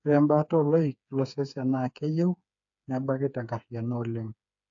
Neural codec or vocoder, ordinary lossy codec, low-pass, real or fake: codec, 16 kHz, 4 kbps, FreqCodec, smaller model; AAC, 64 kbps; 7.2 kHz; fake